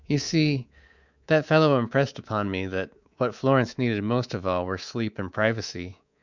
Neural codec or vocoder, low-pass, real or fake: codec, 24 kHz, 3.1 kbps, DualCodec; 7.2 kHz; fake